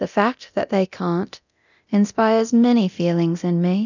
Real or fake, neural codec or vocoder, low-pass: fake; codec, 24 kHz, 0.9 kbps, DualCodec; 7.2 kHz